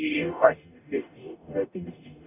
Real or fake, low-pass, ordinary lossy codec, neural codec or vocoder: fake; 3.6 kHz; none; codec, 44.1 kHz, 0.9 kbps, DAC